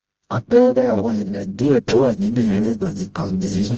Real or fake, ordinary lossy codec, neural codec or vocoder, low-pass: fake; Opus, 24 kbps; codec, 16 kHz, 0.5 kbps, FreqCodec, smaller model; 7.2 kHz